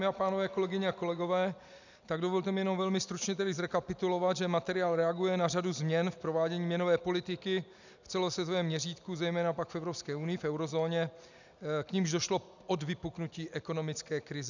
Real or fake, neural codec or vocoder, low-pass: real; none; 7.2 kHz